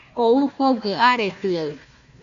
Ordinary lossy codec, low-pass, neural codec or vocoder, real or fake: Opus, 64 kbps; 7.2 kHz; codec, 16 kHz, 1 kbps, FunCodec, trained on Chinese and English, 50 frames a second; fake